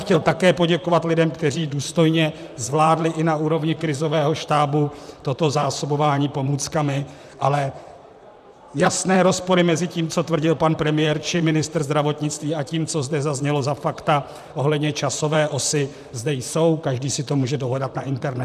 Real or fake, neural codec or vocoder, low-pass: fake; vocoder, 44.1 kHz, 128 mel bands, Pupu-Vocoder; 14.4 kHz